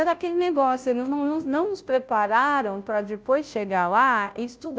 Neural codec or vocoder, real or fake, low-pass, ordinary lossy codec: codec, 16 kHz, 0.5 kbps, FunCodec, trained on Chinese and English, 25 frames a second; fake; none; none